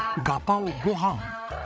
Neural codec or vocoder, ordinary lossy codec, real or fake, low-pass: codec, 16 kHz, 16 kbps, FreqCodec, larger model; none; fake; none